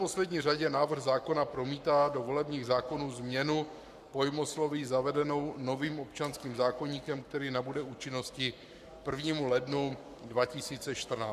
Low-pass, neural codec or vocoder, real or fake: 14.4 kHz; codec, 44.1 kHz, 7.8 kbps, Pupu-Codec; fake